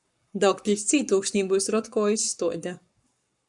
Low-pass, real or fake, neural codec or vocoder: 10.8 kHz; fake; codec, 44.1 kHz, 7.8 kbps, Pupu-Codec